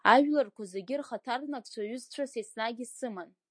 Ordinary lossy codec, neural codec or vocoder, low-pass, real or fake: MP3, 48 kbps; none; 9.9 kHz; real